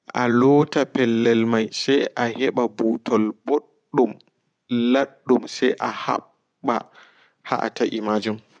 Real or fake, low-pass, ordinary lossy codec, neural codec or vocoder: fake; 9.9 kHz; none; vocoder, 24 kHz, 100 mel bands, Vocos